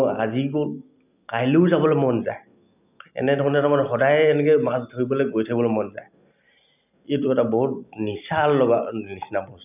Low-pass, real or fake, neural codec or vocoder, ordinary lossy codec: 3.6 kHz; real; none; none